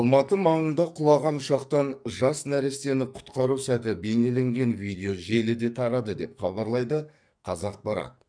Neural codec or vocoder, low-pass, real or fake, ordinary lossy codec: codec, 44.1 kHz, 2.6 kbps, SNAC; 9.9 kHz; fake; none